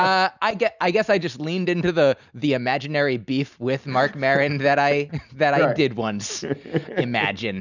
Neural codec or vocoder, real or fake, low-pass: none; real; 7.2 kHz